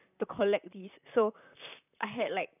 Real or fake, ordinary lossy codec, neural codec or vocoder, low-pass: fake; none; vocoder, 44.1 kHz, 128 mel bands, Pupu-Vocoder; 3.6 kHz